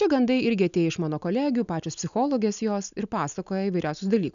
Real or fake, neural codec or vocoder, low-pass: real; none; 7.2 kHz